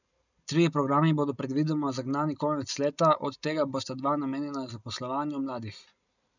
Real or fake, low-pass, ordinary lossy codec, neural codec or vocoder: real; 7.2 kHz; none; none